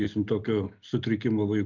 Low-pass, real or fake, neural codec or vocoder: 7.2 kHz; real; none